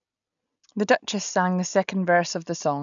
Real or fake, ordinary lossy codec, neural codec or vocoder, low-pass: real; none; none; 7.2 kHz